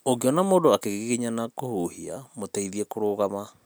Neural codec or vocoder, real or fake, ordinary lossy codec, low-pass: none; real; none; none